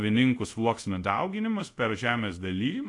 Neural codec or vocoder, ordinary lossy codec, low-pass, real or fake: codec, 24 kHz, 0.5 kbps, DualCodec; AAC, 48 kbps; 10.8 kHz; fake